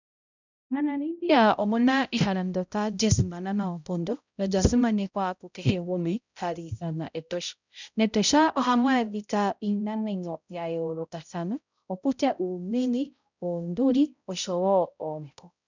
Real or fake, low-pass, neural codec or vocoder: fake; 7.2 kHz; codec, 16 kHz, 0.5 kbps, X-Codec, HuBERT features, trained on balanced general audio